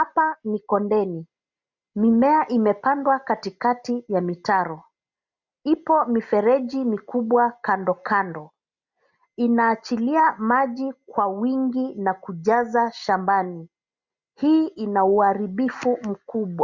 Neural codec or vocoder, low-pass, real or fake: none; 7.2 kHz; real